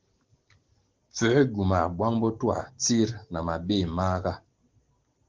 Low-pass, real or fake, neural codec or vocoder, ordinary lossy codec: 7.2 kHz; real; none; Opus, 16 kbps